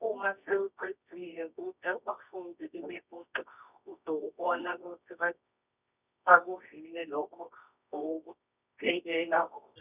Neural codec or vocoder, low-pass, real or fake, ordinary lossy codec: codec, 24 kHz, 0.9 kbps, WavTokenizer, medium music audio release; 3.6 kHz; fake; none